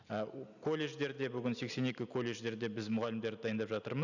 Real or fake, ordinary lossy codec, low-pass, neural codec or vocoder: real; none; 7.2 kHz; none